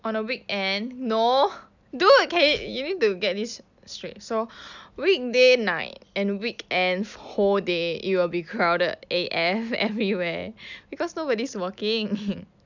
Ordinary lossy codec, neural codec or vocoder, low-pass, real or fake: none; none; 7.2 kHz; real